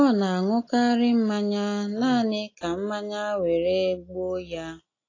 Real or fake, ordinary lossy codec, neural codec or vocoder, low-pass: real; AAC, 32 kbps; none; 7.2 kHz